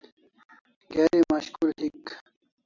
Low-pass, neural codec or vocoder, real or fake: 7.2 kHz; none; real